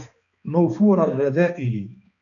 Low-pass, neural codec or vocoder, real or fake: 7.2 kHz; codec, 16 kHz, 2 kbps, X-Codec, HuBERT features, trained on balanced general audio; fake